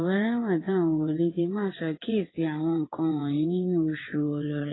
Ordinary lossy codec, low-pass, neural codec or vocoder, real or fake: AAC, 16 kbps; 7.2 kHz; vocoder, 22.05 kHz, 80 mel bands, WaveNeXt; fake